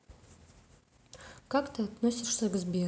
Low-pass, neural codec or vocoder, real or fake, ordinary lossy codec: none; none; real; none